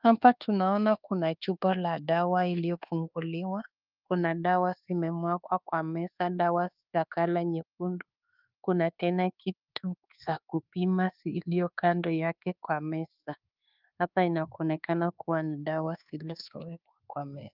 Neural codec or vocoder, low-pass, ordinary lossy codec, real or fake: codec, 24 kHz, 1.2 kbps, DualCodec; 5.4 kHz; Opus, 32 kbps; fake